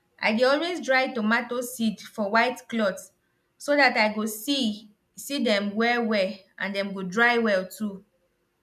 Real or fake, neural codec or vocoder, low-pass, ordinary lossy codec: real; none; 14.4 kHz; none